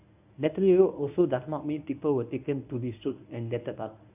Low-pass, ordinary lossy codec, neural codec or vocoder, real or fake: 3.6 kHz; AAC, 32 kbps; codec, 24 kHz, 0.9 kbps, WavTokenizer, medium speech release version 1; fake